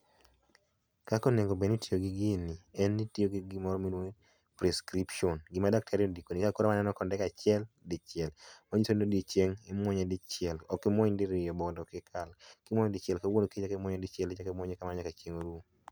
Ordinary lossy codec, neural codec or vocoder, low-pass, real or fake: none; none; none; real